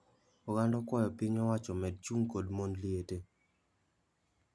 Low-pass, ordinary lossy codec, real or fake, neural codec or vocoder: none; none; real; none